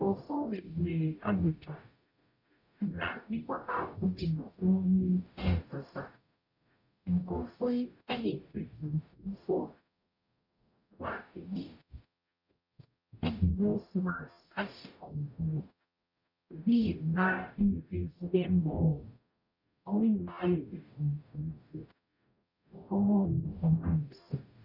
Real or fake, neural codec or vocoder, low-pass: fake; codec, 44.1 kHz, 0.9 kbps, DAC; 5.4 kHz